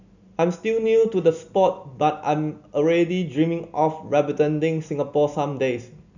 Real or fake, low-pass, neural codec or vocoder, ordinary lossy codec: real; 7.2 kHz; none; none